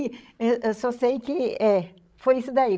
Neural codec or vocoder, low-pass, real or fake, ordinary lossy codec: codec, 16 kHz, 16 kbps, FreqCodec, larger model; none; fake; none